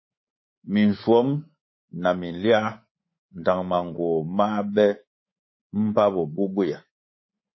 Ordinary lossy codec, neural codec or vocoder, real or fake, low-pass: MP3, 24 kbps; codec, 24 kHz, 3.1 kbps, DualCodec; fake; 7.2 kHz